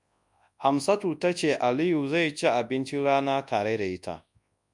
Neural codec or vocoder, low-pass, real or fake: codec, 24 kHz, 0.9 kbps, WavTokenizer, large speech release; 10.8 kHz; fake